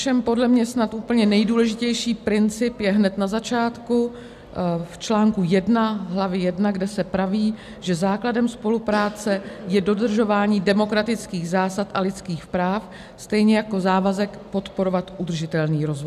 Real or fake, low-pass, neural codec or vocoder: real; 14.4 kHz; none